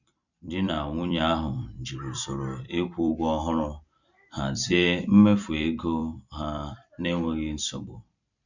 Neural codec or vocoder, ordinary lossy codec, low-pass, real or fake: vocoder, 44.1 kHz, 128 mel bands every 256 samples, BigVGAN v2; none; 7.2 kHz; fake